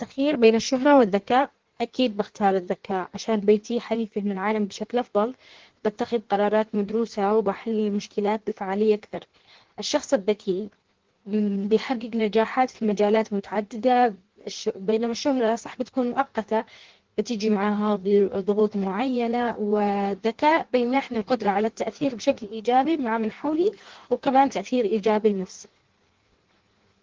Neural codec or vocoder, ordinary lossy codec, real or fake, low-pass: codec, 16 kHz in and 24 kHz out, 1.1 kbps, FireRedTTS-2 codec; Opus, 16 kbps; fake; 7.2 kHz